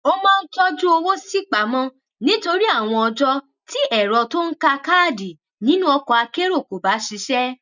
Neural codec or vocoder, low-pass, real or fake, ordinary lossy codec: none; 7.2 kHz; real; none